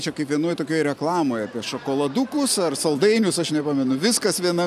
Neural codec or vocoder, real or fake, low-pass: none; real; 14.4 kHz